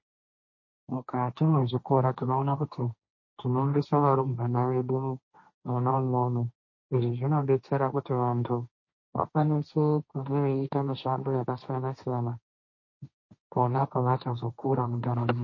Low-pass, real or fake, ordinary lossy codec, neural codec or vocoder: 7.2 kHz; fake; MP3, 32 kbps; codec, 16 kHz, 1.1 kbps, Voila-Tokenizer